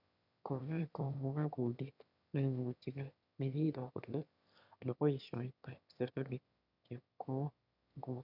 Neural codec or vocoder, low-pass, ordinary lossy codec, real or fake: autoencoder, 22.05 kHz, a latent of 192 numbers a frame, VITS, trained on one speaker; 5.4 kHz; none; fake